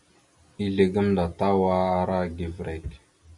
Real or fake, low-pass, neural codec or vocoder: real; 10.8 kHz; none